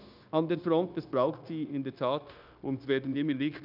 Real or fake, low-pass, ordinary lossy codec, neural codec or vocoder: fake; 5.4 kHz; none; codec, 16 kHz, 0.9 kbps, LongCat-Audio-Codec